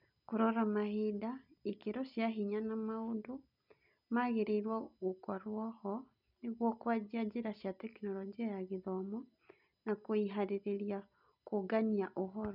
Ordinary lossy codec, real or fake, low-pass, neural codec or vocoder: none; real; 5.4 kHz; none